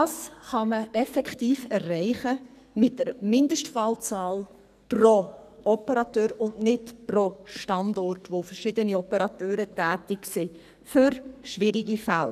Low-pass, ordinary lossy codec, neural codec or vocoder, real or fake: 14.4 kHz; none; codec, 44.1 kHz, 2.6 kbps, SNAC; fake